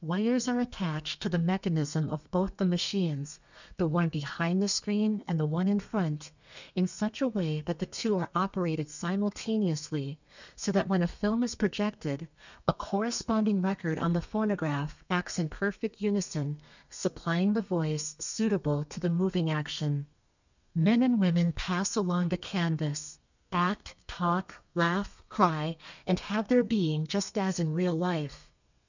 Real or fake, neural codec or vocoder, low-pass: fake; codec, 32 kHz, 1.9 kbps, SNAC; 7.2 kHz